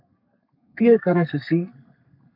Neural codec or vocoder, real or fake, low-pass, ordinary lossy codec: codec, 32 kHz, 1.9 kbps, SNAC; fake; 5.4 kHz; MP3, 48 kbps